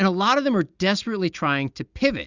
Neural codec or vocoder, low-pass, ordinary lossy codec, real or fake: none; 7.2 kHz; Opus, 64 kbps; real